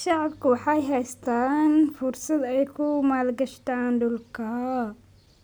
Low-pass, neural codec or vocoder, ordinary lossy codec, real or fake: none; none; none; real